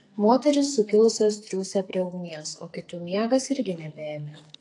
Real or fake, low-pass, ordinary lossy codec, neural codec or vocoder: fake; 10.8 kHz; AAC, 48 kbps; codec, 44.1 kHz, 2.6 kbps, SNAC